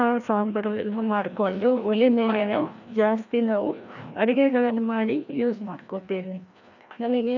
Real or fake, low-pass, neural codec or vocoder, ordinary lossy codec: fake; 7.2 kHz; codec, 16 kHz, 1 kbps, FreqCodec, larger model; none